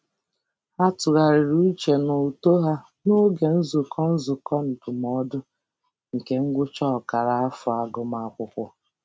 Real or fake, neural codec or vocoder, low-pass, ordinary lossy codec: real; none; none; none